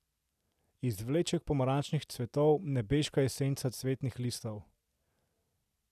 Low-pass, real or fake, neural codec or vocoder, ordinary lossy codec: 14.4 kHz; real; none; none